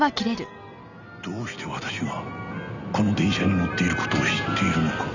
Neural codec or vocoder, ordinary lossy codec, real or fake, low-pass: none; none; real; 7.2 kHz